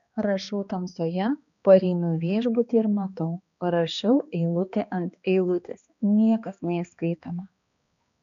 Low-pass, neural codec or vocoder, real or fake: 7.2 kHz; codec, 16 kHz, 2 kbps, X-Codec, HuBERT features, trained on balanced general audio; fake